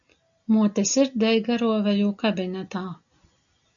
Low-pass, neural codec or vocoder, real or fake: 7.2 kHz; none; real